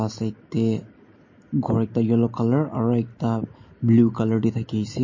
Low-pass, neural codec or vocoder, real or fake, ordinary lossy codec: 7.2 kHz; none; real; MP3, 32 kbps